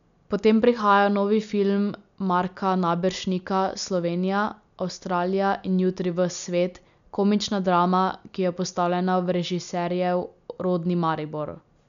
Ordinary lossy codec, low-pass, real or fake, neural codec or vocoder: none; 7.2 kHz; real; none